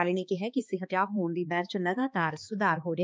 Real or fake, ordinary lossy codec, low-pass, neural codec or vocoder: fake; none; none; codec, 16 kHz, 2 kbps, X-Codec, HuBERT features, trained on balanced general audio